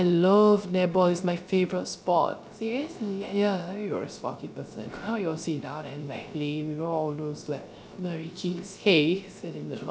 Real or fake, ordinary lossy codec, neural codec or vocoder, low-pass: fake; none; codec, 16 kHz, 0.3 kbps, FocalCodec; none